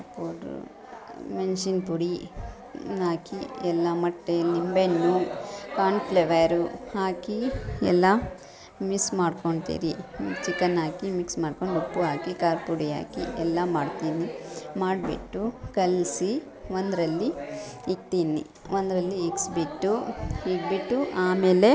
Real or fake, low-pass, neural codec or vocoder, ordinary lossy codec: real; none; none; none